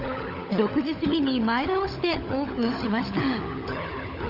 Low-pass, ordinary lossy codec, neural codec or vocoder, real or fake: 5.4 kHz; Opus, 64 kbps; codec, 16 kHz, 16 kbps, FunCodec, trained on LibriTTS, 50 frames a second; fake